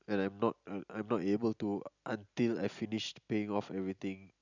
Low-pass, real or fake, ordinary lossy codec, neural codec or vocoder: 7.2 kHz; real; none; none